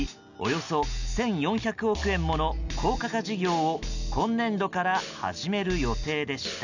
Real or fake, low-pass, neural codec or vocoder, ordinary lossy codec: real; 7.2 kHz; none; none